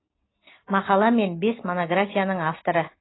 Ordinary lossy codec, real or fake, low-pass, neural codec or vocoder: AAC, 16 kbps; real; 7.2 kHz; none